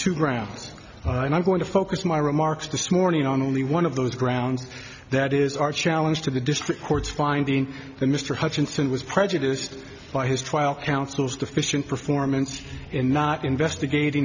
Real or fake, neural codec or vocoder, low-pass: real; none; 7.2 kHz